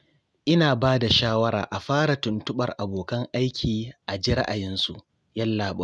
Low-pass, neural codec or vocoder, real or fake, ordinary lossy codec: none; none; real; none